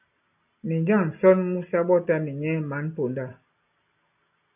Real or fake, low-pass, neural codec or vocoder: real; 3.6 kHz; none